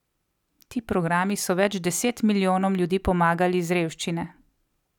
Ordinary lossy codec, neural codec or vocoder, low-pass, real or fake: none; none; 19.8 kHz; real